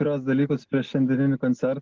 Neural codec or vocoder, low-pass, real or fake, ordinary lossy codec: none; 7.2 kHz; real; Opus, 16 kbps